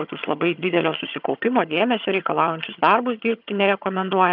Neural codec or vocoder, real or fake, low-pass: vocoder, 22.05 kHz, 80 mel bands, HiFi-GAN; fake; 5.4 kHz